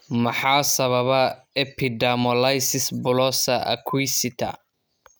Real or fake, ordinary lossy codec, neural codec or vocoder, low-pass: real; none; none; none